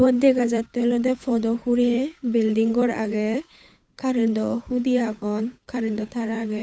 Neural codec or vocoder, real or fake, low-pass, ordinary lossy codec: codec, 16 kHz, 8 kbps, FunCodec, trained on Chinese and English, 25 frames a second; fake; none; none